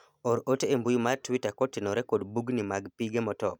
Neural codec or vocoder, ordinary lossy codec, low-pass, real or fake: none; none; 19.8 kHz; real